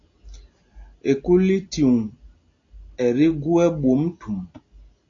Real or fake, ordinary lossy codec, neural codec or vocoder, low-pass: real; AAC, 48 kbps; none; 7.2 kHz